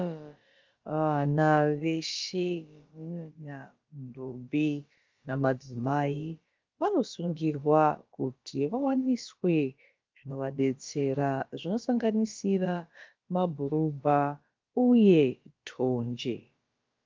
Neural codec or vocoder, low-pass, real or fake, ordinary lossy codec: codec, 16 kHz, about 1 kbps, DyCAST, with the encoder's durations; 7.2 kHz; fake; Opus, 32 kbps